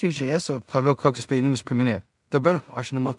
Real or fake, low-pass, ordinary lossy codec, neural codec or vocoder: fake; 10.8 kHz; MP3, 96 kbps; codec, 16 kHz in and 24 kHz out, 0.4 kbps, LongCat-Audio-Codec, two codebook decoder